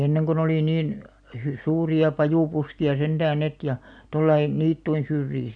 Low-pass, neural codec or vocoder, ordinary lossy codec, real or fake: 9.9 kHz; none; none; real